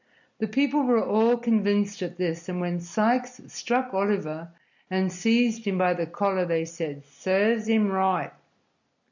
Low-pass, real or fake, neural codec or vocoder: 7.2 kHz; real; none